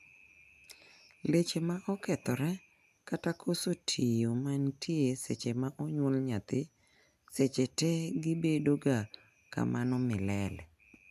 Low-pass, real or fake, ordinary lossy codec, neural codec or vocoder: 14.4 kHz; real; none; none